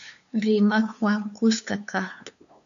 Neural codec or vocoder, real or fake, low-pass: codec, 16 kHz, 2 kbps, FunCodec, trained on LibriTTS, 25 frames a second; fake; 7.2 kHz